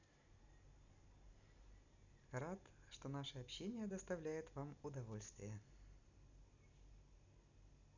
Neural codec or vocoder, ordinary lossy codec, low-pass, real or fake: none; none; 7.2 kHz; real